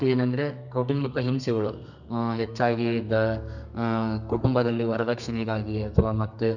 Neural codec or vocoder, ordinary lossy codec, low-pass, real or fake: codec, 32 kHz, 1.9 kbps, SNAC; none; 7.2 kHz; fake